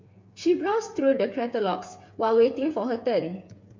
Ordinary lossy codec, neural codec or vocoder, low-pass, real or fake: MP3, 48 kbps; codec, 16 kHz, 8 kbps, FreqCodec, smaller model; 7.2 kHz; fake